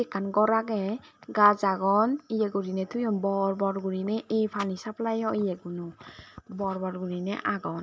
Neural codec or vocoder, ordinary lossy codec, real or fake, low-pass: none; none; real; none